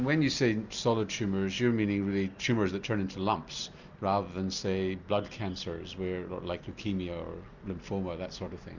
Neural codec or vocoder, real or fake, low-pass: none; real; 7.2 kHz